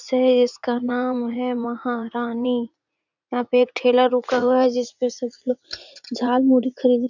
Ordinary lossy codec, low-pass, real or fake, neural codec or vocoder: none; 7.2 kHz; fake; vocoder, 44.1 kHz, 80 mel bands, Vocos